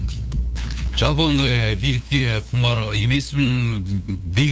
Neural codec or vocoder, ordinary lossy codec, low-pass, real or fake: codec, 16 kHz, 2 kbps, FunCodec, trained on LibriTTS, 25 frames a second; none; none; fake